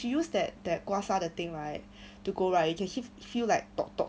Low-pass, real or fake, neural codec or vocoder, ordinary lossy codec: none; real; none; none